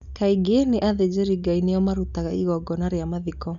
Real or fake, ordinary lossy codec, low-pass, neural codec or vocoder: real; none; 7.2 kHz; none